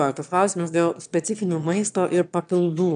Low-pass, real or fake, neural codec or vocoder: 9.9 kHz; fake; autoencoder, 22.05 kHz, a latent of 192 numbers a frame, VITS, trained on one speaker